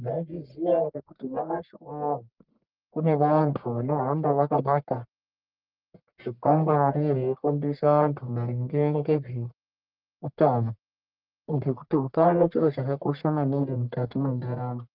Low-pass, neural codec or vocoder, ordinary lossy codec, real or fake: 5.4 kHz; codec, 44.1 kHz, 1.7 kbps, Pupu-Codec; Opus, 24 kbps; fake